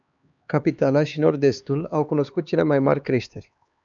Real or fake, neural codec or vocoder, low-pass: fake; codec, 16 kHz, 2 kbps, X-Codec, HuBERT features, trained on LibriSpeech; 7.2 kHz